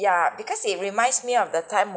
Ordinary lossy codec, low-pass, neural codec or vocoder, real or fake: none; none; none; real